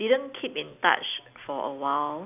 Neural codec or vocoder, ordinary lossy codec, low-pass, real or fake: none; none; 3.6 kHz; real